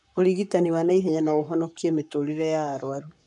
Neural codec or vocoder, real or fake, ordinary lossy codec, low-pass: codec, 44.1 kHz, 7.8 kbps, Pupu-Codec; fake; none; 10.8 kHz